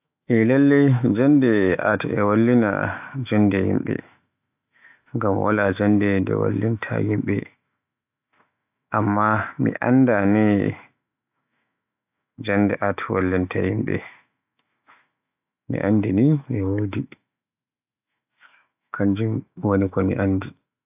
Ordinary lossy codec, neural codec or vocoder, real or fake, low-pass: AAC, 32 kbps; autoencoder, 48 kHz, 128 numbers a frame, DAC-VAE, trained on Japanese speech; fake; 3.6 kHz